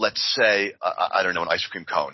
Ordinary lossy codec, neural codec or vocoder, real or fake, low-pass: MP3, 24 kbps; none; real; 7.2 kHz